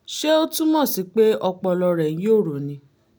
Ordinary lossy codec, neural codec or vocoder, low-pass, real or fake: none; none; none; real